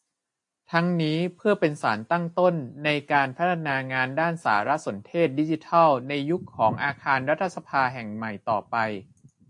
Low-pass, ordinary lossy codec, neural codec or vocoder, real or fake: 10.8 kHz; AAC, 64 kbps; none; real